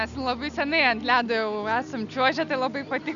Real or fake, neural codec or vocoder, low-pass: real; none; 7.2 kHz